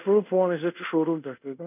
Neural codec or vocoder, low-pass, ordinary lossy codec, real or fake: codec, 24 kHz, 0.5 kbps, DualCodec; 3.6 kHz; none; fake